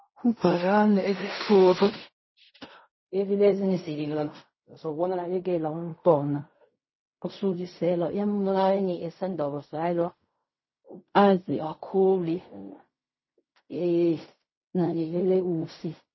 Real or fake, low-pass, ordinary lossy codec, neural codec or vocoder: fake; 7.2 kHz; MP3, 24 kbps; codec, 16 kHz in and 24 kHz out, 0.4 kbps, LongCat-Audio-Codec, fine tuned four codebook decoder